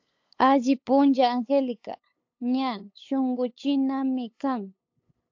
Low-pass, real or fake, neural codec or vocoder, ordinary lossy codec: 7.2 kHz; fake; codec, 16 kHz, 8 kbps, FunCodec, trained on LibriTTS, 25 frames a second; AAC, 48 kbps